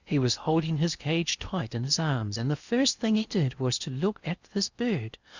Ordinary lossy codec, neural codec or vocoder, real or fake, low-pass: Opus, 64 kbps; codec, 16 kHz in and 24 kHz out, 0.8 kbps, FocalCodec, streaming, 65536 codes; fake; 7.2 kHz